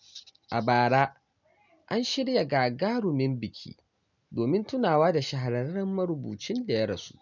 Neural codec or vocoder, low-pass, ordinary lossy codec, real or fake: none; 7.2 kHz; none; real